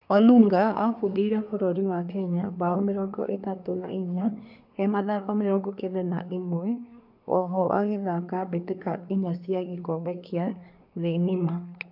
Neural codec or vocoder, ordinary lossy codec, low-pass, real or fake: codec, 24 kHz, 1 kbps, SNAC; none; 5.4 kHz; fake